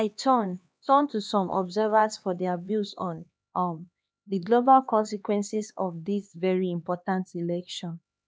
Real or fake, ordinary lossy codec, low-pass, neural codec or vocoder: fake; none; none; codec, 16 kHz, 2 kbps, X-Codec, HuBERT features, trained on LibriSpeech